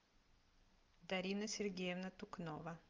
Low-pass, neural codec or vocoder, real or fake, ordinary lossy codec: 7.2 kHz; none; real; Opus, 24 kbps